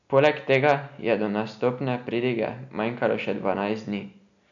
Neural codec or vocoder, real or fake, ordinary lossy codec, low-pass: none; real; MP3, 96 kbps; 7.2 kHz